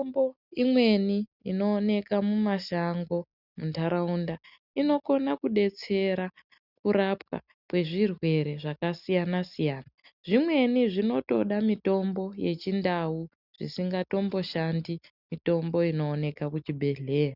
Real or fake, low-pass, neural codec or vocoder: real; 5.4 kHz; none